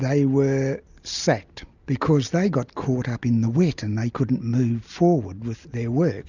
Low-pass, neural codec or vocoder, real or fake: 7.2 kHz; none; real